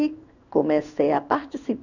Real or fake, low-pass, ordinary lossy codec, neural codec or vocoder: fake; 7.2 kHz; none; codec, 16 kHz in and 24 kHz out, 1 kbps, XY-Tokenizer